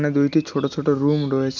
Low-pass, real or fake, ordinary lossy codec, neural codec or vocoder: 7.2 kHz; real; none; none